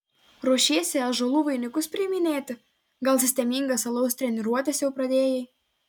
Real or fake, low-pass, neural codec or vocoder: real; 19.8 kHz; none